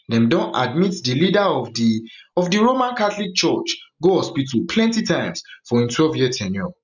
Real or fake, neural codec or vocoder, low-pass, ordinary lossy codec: real; none; 7.2 kHz; none